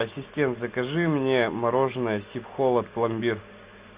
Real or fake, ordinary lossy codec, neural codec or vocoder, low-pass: real; Opus, 64 kbps; none; 3.6 kHz